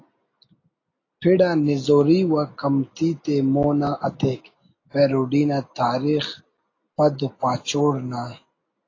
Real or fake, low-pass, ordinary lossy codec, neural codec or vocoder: real; 7.2 kHz; AAC, 32 kbps; none